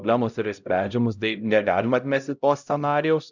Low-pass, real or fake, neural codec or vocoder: 7.2 kHz; fake; codec, 16 kHz, 0.5 kbps, X-Codec, HuBERT features, trained on LibriSpeech